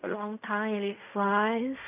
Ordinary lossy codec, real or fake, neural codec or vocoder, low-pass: none; fake; codec, 16 kHz in and 24 kHz out, 0.4 kbps, LongCat-Audio-Codec, fine tuned four codebook decoder; 3.6 kHz